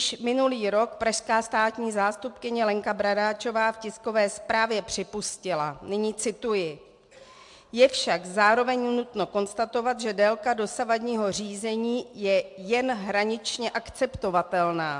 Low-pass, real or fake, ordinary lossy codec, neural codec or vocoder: 10.8 kHz; real; MP3, 64 kbps; none